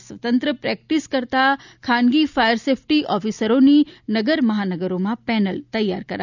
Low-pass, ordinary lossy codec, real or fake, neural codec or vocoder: 7.2 kHz; none; real; none